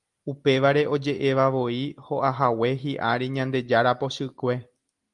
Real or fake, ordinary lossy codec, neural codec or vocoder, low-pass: real; Opus, 32 kbps; none; 10.8 kHz